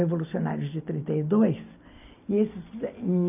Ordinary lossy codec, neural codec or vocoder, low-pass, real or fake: none; none; 3.6 kHz; real